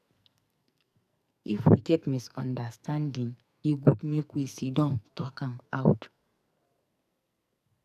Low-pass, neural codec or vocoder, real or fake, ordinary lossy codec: 14.4 kHz; codec, 44.1 kHz, 2.6 kbps, SNAC; fake; none